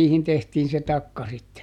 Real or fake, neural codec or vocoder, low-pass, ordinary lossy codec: real; none; 19.8 kHz; none